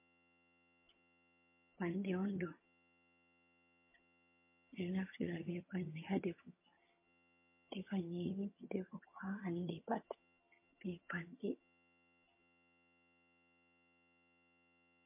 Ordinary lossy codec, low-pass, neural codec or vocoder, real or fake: MP3, 24 kbps; 3.6 kHz; vocoder, 22.05 kHz, 80 mel bands, HiFi-GAN; fake